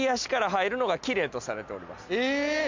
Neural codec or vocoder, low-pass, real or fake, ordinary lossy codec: none; 7.2 kHz; real; MP3, 64 kbps